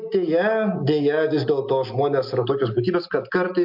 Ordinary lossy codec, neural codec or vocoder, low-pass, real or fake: MP3, 48 kbps; none; 5.4 kHz; real